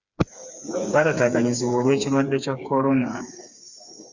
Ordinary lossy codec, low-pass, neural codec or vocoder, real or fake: Opus, 64 kbps; 7.2 kHz; codec, 16 kHz, 4 kbps, FreqCodec, smaller model; fake